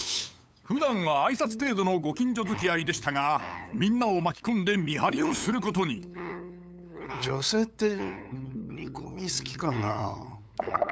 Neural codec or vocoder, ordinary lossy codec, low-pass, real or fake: codec, 16 kHz, 8 kbps, FunCodec, trained on LibriTTS, 25 frames a second; none; none; fake